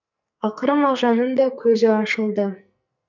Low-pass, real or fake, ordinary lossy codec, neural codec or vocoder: 7.2 kHz; fake; none; codec, 44.1 kHz, 2.6 kbps, SNAC